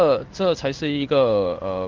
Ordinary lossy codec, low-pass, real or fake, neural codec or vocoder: Opus, 32 kbps; 7.2 kHz; fake; codec, 16 kHz in and 24 kHz out, 1 kbps, XY-Tokenizer